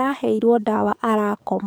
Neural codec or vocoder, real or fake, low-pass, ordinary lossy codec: codec, 44.1 kHz, 7.8 kbps, DAC; fake; none; none